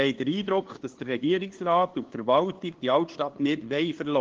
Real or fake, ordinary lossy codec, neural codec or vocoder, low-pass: fake; Opus, 16 kbps; codec, 16 kHz, 2 kbps, FunCodec, trained on LibriTTS, 25 frames a second; 7.2 kHz